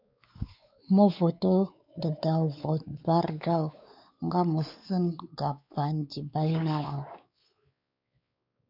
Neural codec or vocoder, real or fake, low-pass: codec, 16 kHz, 4 kbps, X-Codec, WavLM features, trained on Multilingual LibriSpeech; fake; 5.4 kHz